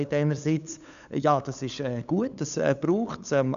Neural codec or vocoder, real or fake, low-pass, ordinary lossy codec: codec, 16 kHz, 8 kbps, FunCodec, trained on LibriTTS, 25 frames a second; fake; 7.2 kHz; none